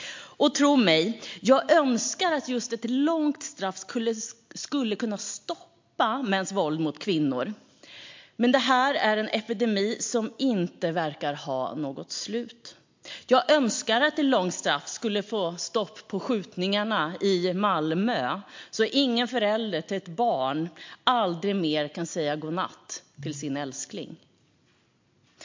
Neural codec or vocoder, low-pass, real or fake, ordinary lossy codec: none; 7.2 kHz; real; MP3, 48 kbps